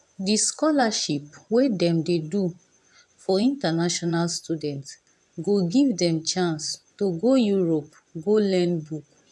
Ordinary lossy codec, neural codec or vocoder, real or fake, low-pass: none; vocoder, 24 kHz, 100 mel bands, Vocos; fake; none